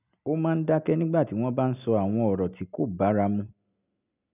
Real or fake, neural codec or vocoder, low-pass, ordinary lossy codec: real; none; 3.6 kHz; none